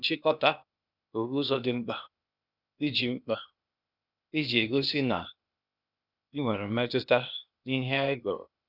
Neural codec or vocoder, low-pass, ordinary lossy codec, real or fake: codec, 16 kHz, 0.8 kbps, ZipCodec; 5.4 kHz; none; fake